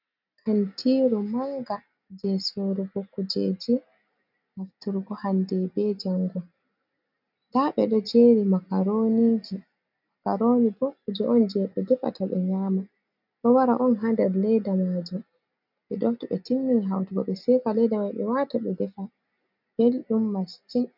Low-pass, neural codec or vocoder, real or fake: 5.4 kHz; none; real